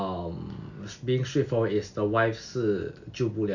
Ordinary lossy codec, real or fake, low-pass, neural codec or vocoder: none; real; 7.2 kHz; none